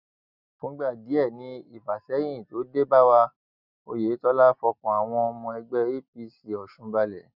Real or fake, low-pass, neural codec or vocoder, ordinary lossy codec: real; 5.4 kHz; none; none